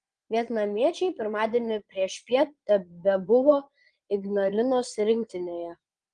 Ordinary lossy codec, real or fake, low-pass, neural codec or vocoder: Opus, 24 kbps; fake; 9.9 kHz; vocoder, 22.05 kHz, 80 mel bands, Vocos